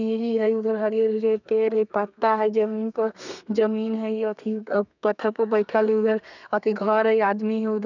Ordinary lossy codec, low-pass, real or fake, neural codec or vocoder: none; 7.2 kHz; fake; codec, 32 kHz, 1.9 kbps, SNAC